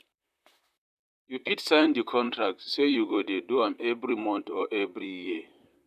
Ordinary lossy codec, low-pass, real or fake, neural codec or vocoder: none; 14.4 kHz; fake; vocoder, 44.1 kHz, 128 mel bands, Pupu-Vocoder